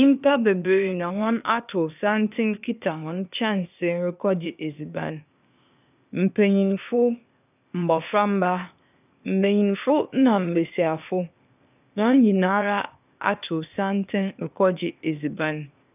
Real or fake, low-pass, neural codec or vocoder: fake; 3.6 kHz; codec, 16 kHz, 0.8 kbps, ZipCodec